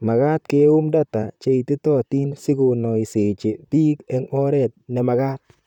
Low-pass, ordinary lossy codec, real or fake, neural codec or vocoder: 19.8 kHz; none; fake; vocoder, 44.1 kHz, 128 mel bands, Pupu-Vocoder